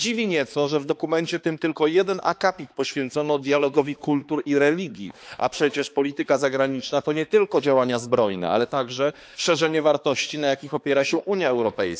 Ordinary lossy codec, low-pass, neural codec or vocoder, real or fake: none; none; codec, 16 kHz, 2 kbps, X-Codec, HuBERT features, trained on balanced general audio; fake